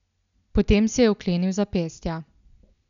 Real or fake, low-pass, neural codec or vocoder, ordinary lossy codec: real; 7.2 kHz; none; none